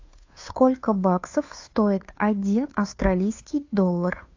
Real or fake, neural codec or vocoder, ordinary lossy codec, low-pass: fake; codec, 16 kHz, 2 kbps, FunCodec, trained on Chinese and English, 25 frames a second; AAC, 48 kbps; 7.2 kHz